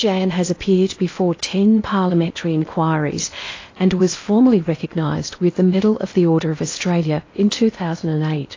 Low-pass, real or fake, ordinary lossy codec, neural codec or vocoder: 7.2 kHz; fake; AAC, 32 kbps; codec, 16 kHz in and 24 kHz out, 0.8 kbps, FocalCodec, streaming, 65536 codes